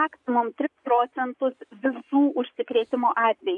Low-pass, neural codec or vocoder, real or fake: 10.8 kHz; vocoder, 24 kHz, 100 mel bands, Vocos; fake